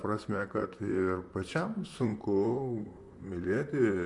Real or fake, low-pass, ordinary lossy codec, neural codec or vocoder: fake; 10.8 kHz; MP3, 64 kbps; vocoder, 44.1 kHz, 128 mel bands, Pupu-Vocoder